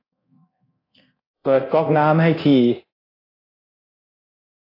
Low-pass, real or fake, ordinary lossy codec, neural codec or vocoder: 5.4 kHz; fake; AAC, 24 kbps; codec, 24 kHz, 0.9 kbps, DualCodec